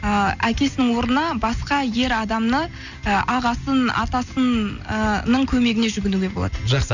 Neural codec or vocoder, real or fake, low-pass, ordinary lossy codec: none; real; 7.2 kHz; none